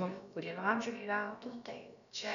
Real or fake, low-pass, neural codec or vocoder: fake; 7.2 kHz; codec, 16 kHz, about 1 kbps, DyCAST, with the encoder's durations